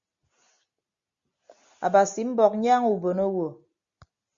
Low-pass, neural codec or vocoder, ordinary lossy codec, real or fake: 7.2 kHz; none; Opus, 64 kbps; real